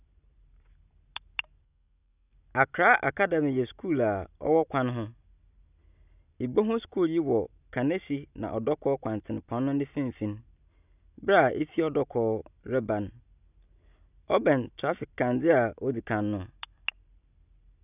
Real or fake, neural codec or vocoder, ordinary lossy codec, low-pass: real; none; none; 3.6 kHz